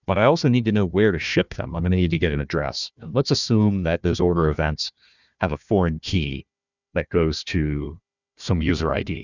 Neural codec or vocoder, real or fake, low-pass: codec, 16 kHz, 1 kbps, FunCodec, trained on Chinese and English, 50 frames a second; fake; 7.2 kHz